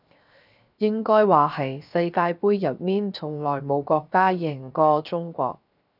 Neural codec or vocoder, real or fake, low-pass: codec, 16 kHz, 0.7 kbps, FocalCodec; fake; 5.4 kHz